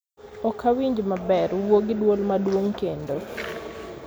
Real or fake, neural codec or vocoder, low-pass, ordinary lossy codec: real; none; none; none